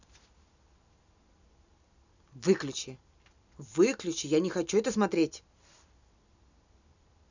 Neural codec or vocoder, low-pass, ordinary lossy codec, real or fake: none; 7.2 kHz; none; real